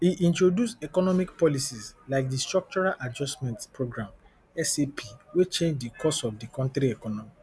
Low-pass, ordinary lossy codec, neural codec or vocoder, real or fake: none; none; none; real